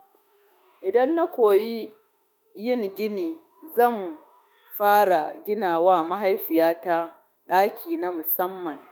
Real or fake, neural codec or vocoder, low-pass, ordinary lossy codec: fake; autoencoder, 48 kHz, 32 numbers a frame, DAC-VAE, trained on Japanese speech; none; none